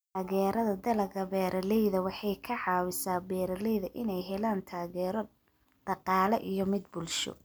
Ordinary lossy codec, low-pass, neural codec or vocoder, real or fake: none; none; none; real